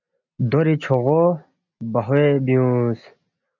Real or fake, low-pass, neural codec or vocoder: real; 7.2 kHz; none